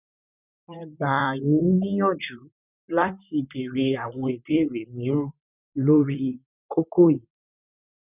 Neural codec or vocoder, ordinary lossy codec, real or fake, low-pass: vocoder, 44.1 kHz, 128 mel bands, Pupu-Vocoder; none; fake; 3.6 kHz